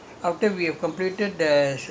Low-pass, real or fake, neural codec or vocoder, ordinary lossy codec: none; real; none; none